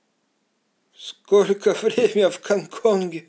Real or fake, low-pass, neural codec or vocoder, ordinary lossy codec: real; none; none; none